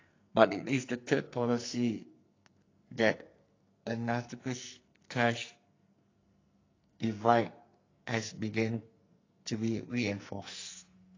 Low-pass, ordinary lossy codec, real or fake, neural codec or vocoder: 7.2 kHz; AAC, 32 kbps; fake; codec, 44.1 kHz, 2.6 kbps, SNAC